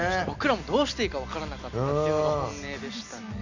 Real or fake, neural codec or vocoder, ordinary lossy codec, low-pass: real; none; none; 7.2 kHz